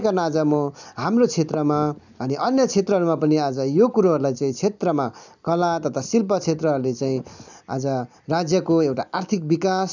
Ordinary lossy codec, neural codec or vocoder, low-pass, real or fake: none; none; 7.2 kHz; real